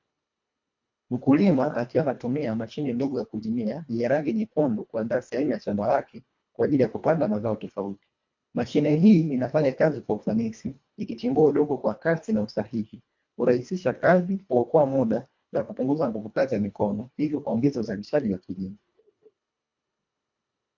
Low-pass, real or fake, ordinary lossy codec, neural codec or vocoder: 7.2 kHz; fake; MP3, 48 kbps; codec, 24 kHz, 1.5 kbps, HILCodec